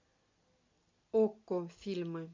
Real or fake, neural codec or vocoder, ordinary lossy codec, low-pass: real; none; MP3, 48 kbps; 7.2 kHz